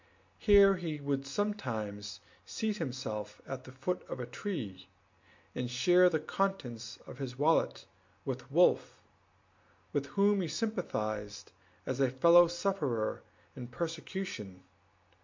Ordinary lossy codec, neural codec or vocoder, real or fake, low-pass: MP3, 48 kbps; none; real; 7.2 kHz